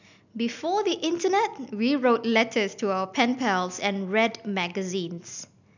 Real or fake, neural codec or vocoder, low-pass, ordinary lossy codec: real; none; 7.2 kHz; none